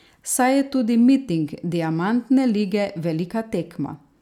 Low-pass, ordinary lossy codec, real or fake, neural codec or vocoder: 19.8 kHz; none; real; none